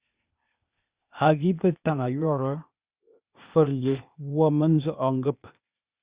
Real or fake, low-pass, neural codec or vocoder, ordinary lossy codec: fake; 3.6 kHz; codec, 16 kHz, 0.8 kbps, ZipCodec; Opus, 64 kbps